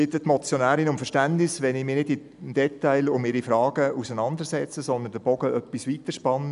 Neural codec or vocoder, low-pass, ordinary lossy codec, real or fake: none; 10.8 kHz; none; real